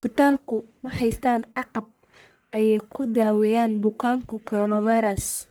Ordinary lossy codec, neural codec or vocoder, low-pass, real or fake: none; codec, 44.1 kHz, 1.7 kbps, Pupu-Codec; none; fake